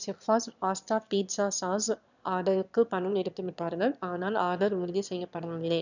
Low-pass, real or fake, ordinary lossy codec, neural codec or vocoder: 7.2 kHz; fake; none; autoencoder, 22.05 kHz, a latent of 192 numbers a frame, VITS, trained on one speaker